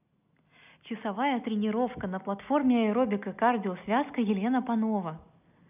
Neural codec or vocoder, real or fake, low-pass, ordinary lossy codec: none; real; 3.6 kHz; none